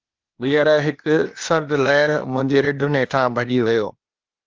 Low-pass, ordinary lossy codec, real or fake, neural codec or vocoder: 7.2 kHz; Opus, 32 kbps; fake; codec, 16 kHz, 0.8 kbps, ZipCodec